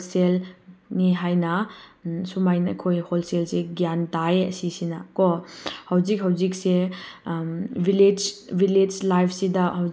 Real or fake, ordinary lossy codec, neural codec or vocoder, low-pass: real; none; none; none